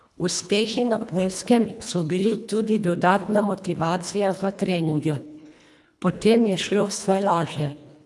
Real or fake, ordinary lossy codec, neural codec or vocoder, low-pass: fake; none; codec, 24 kHz, 1.5 kbps, HILCodec; none